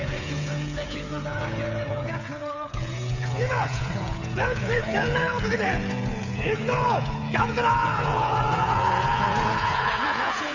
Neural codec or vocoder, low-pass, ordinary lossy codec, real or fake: codec, 16 kHz, 8 kbps, FreqCodec, smaller model; 7.2 kHz; none; fake